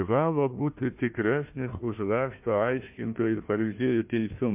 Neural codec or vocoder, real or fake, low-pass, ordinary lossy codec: codec, 16 kHz, 1 kbps, FunCodec, trained on Chinese and English, 50 frames a second; fake; 3.6 kHz; MP3, 32 kbps